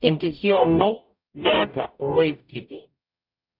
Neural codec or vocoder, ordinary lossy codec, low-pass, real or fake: codec, 44.1 kHz, 0.9 kbps, DAC; none; 5.4 kHz; fake